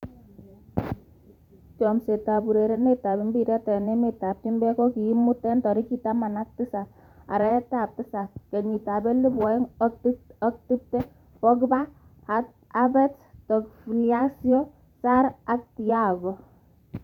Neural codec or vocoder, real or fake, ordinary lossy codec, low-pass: vocoder, 44.1 kHz, 128 mel bands every 512 samples, BigVGAN v2; fake; none; 19.8 kHz